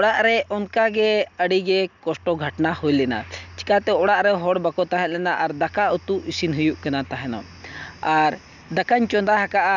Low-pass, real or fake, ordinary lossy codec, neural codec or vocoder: 7.2 kHz; real; none; none